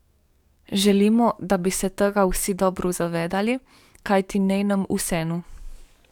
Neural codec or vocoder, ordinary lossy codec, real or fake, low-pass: codec, 44.1 kHz, 7.8 kbps, DAC; none; fake; 19.8 kHz